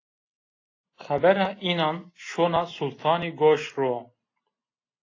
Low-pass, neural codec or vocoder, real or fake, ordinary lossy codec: 7.2 kHz; none; real; AAC, 32 kbps